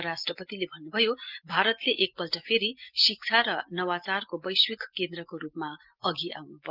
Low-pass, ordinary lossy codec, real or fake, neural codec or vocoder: 5.4 kHz; Opus, 24 kbps; real; none